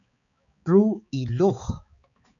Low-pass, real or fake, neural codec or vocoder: 7.2 kHz; fake; codec, 16 kHz, 4 kbps, X-Codec, HuBERT features, trained on balanced general audio